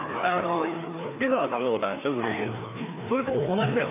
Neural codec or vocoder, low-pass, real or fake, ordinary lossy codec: codec, 16 kHz, 2 kbps, FreqCodec, larger model; 3.6 kHz; fake; AAC, 24 kbps